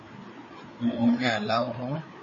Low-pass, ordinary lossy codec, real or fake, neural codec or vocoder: 7.2 kHz; MP3, 32 kbps; fake; codec, 16 kHz, 4 kbps, FreqCodec, larger model